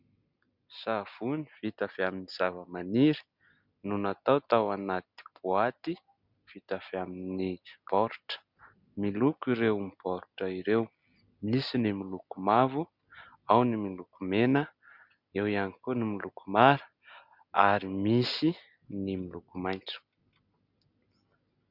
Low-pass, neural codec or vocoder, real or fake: 5.4 kHz; none; real